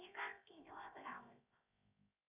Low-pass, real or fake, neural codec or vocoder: 3.6 kHz; fake; codec, 16 kHz, 0.7 kbps, FocalCodec